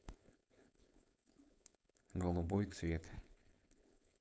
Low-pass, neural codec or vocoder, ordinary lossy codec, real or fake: none; codec, 16 kHz, 4.8 kbps, FACodec; none; fake